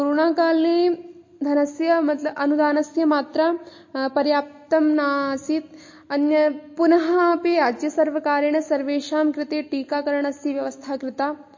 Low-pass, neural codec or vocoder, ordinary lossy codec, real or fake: 7.2 kHz; none; MP3, 32 kbps; real